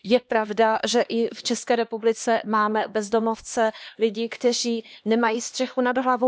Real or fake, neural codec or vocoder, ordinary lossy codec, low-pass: fake; codec, 16 kHz, 2 kbps, X-Codec, HuBERT features, trained on LibriSpeech; none; none